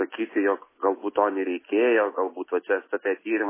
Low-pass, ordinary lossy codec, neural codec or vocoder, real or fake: 3.6 kHz; MP3, 16 kbps; none; real